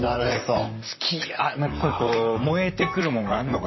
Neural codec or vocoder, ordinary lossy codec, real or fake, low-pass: vocoder, 44.1 kHz, 128 mel bands, Pupu-Vocoder; MP3, 24 kbps; fake; 7.2 kHz